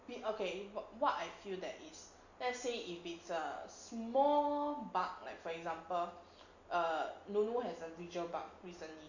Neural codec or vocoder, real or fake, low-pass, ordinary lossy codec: none; real; 7.2 kHz; none